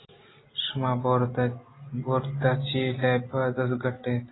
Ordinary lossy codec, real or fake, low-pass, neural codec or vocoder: AAC, 16 kbps; real; 7.2 kHz; none